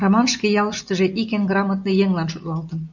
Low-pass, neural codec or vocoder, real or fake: 7.2 kHz; none; real